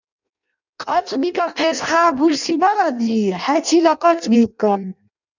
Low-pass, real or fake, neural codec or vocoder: 7.2 kHz; fake; codec, 16 kHz in and 24 kHz out, 0.6 kbps, FireRedTTS-2 codec